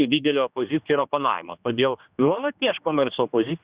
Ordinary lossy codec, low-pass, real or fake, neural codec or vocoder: Opus, 64 kbps; 3.6 kHz; fake; codec, 16 kHz, 2 kbps, X-Codec, HuBERT features, trained on general audio